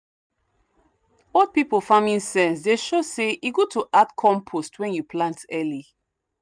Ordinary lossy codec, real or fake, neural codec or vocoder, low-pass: none; real; none; 9.9 kHz